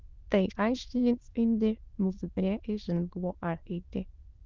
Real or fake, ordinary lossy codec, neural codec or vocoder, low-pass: fake; Opus, 24 kbps; autoencoder, 22.05 kHz, a latent of 192 numbers a frame, VITS, trained on many speakers; 7.2 kHz